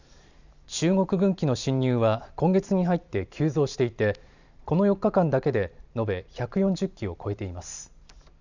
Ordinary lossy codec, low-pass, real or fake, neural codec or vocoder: none; 7.2 kHz; fake; vocoder, 44.1 kHz, 128 mel bands every 512 samples, BigVGAN v2